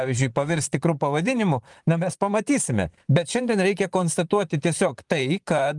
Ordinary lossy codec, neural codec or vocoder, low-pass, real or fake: Opus, 32 kbps; vocoder, 44.1 kHz, 128 mel bands, Pupu-Vocoder; 10.8 kHz; fake